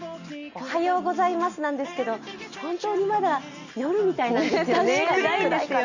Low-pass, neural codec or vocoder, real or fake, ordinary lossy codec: 7.2 kHz; none; real; Opus, 64 kbps